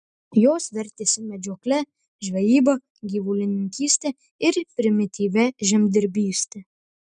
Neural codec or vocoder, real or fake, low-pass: none; real; 9.9 kHz